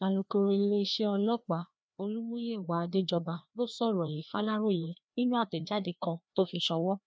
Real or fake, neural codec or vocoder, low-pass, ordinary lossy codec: fake; codec, 16 kHz, 2 kbps, FreqCodec, larger model; none; none